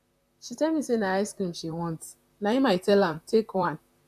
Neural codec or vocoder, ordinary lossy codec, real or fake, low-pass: vocoder, 44.1 kHz, 128 mel bands, Pupu-Vocoder; AAC, 96 kbps; fake; 14.4 kHz